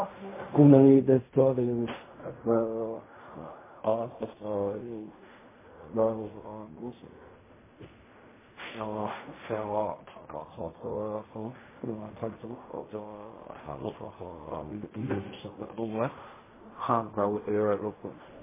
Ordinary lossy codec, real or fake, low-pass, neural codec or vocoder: MP3, 16 kbps; fake; 3.6 kHz; codec, 16 kHz in and 24 kHz out, 0.4 kbps, LongCat-Audio-Codec, fine tuned four codebook decoder